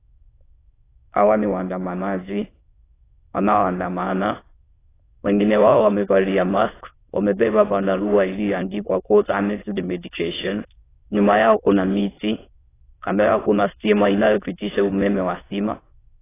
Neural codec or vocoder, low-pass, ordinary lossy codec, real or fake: autoencoder, 22.05 kHz, a latent of 192 numbers a frame, VITS, trained on many speakers; 3.6 kHz; AAC, 16 kbps; fake